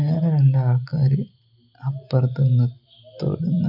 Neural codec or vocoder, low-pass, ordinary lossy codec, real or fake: none; 5.4 kHz; none; real